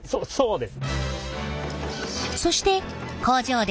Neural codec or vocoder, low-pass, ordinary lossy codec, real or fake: none; none; none; real